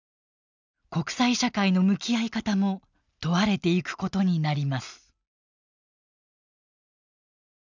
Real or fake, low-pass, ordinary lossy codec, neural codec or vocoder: real; 7.2 kHz; none; none